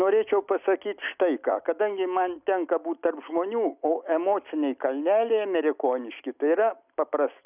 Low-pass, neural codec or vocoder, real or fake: 3.6 kHz; none; real